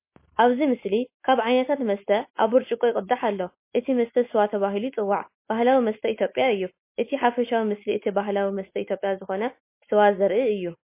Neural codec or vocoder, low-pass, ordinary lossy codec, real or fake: none; 3.6 kHz; MP3, 24 kbps; real